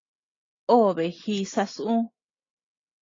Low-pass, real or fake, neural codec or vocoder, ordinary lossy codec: 7.2 kHz; real; none; AAC, 32 kbps